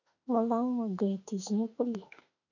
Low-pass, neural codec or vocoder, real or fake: 7.2 kHz; autoencoder, 48 kHz, 32 numbers a frame, DAC-VAE, trained on Japanese speech; fake